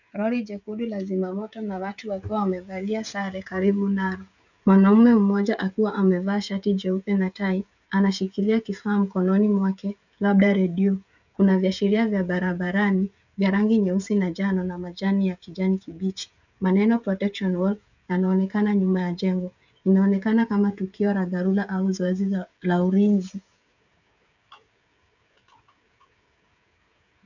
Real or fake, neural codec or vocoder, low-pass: fake; codec, 16 kHz, 16 kbps, FreqCodec, smaller model; 7.2 kHz